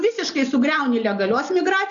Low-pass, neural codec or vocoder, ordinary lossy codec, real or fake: 7.2 kHz; none; AAC, 64 kbps; real